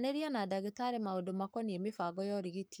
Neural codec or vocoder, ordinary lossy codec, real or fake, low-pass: codec, 44.1 kHz, 7.8 kbps, Pupu-Codec; none; fake; none